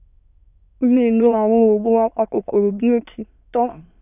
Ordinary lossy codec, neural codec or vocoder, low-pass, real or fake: none; autoencoder, 22.05 kHz, a latent of 192 numbers a frame, VITS, trained on many speakers; 3.6 kHz; fake